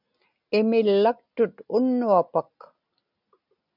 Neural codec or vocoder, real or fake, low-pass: none; real; 5.4 kHz